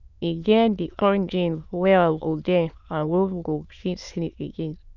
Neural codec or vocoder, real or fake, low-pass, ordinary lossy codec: autoencoder, 22.05 kHz, a latent of 192 numbers a frame, VITS, trained on many speakers; fake; 7.2 kHz; none